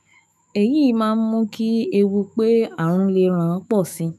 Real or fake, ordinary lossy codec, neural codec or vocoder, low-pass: fake; none; autoencoder, 48 kHz, 128 numbers a frame, DAC-VAE, trained on Japanese speech; 14.4 kHz